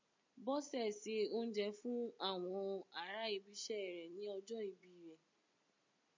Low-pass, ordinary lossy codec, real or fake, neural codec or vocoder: 7.2 kHz; MP3, 48 kbps; real; none